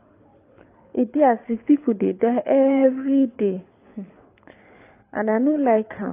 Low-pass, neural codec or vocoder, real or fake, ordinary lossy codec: 3.6 kHz; codec, 24 kHz, 6 kbps, HILCodec; fake; AAC, 24 kbps